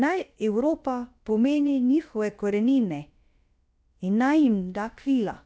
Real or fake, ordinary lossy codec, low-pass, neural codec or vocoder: fake; none; none; codec, 16 kHz, about 1 kbps, DyCAST, with the encoder's durations